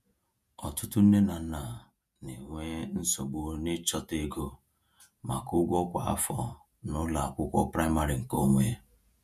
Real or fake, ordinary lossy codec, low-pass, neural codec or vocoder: fake; none; 14.4 kHz; vocoder, 48 kHz, 128 mel bands, Vocos